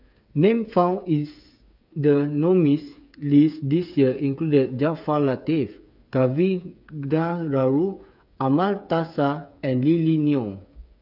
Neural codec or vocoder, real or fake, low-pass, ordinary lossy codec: codec, 16 kHz, 8 kbps, FreqCodec, smaller model; fake; 5.4 kHz; none